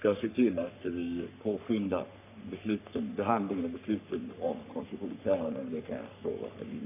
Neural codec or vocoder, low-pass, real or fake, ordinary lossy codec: codec, 44.1 kHz, 3.4 kbps, Pupu-Codec; 3.6 kHz; fake; none